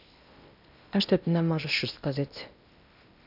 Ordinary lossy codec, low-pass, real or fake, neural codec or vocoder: none; 5.4 kHz; fake; codec, 16 kHz in and 24 kHz out, 0.8 kbps, FocalCodec, streaming, 65536 codes